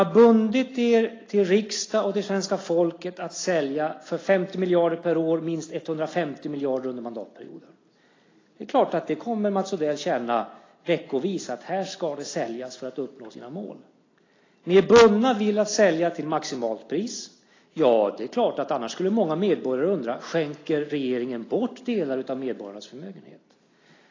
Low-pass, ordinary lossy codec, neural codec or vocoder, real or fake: 7.2 kHz; AAC, 32 kbps; none; real